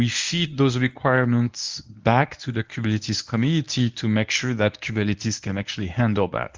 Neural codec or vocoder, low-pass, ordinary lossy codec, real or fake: codec, 24 kHz, 0.9 kbps, WavTokenizer, medium speech release version 1; 7.2 kHz; Opus, 32 kbps; fake